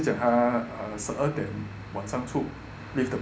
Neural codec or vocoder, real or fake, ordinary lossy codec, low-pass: none; real; none; none